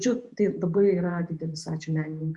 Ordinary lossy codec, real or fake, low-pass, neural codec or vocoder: Opus, 64 kbps; fake; 10.8 kHz; vocoder, 48 kHz, 128 mel bands, Vocos